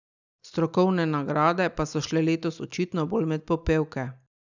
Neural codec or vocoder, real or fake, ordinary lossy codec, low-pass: none; real; none; 7.2 kHz